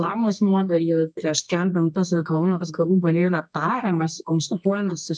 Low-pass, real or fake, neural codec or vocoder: 10.8 kHz; fake; codec, 24 kHz, 0.9 kbps, WavTokenizer, medium music audio release